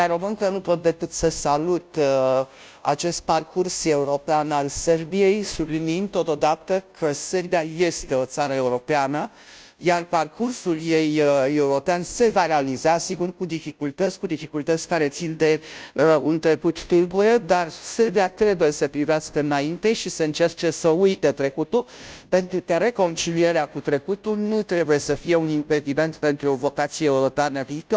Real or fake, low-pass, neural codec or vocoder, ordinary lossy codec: fake; none; codec, 16 kHz, 0.5 kbps, FunCodec, trained on Chinese and English, 25 frames a second; none